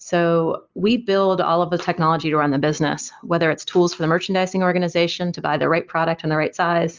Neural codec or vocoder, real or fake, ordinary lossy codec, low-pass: none; real; Opus, 24 kbps; 7.2 kHz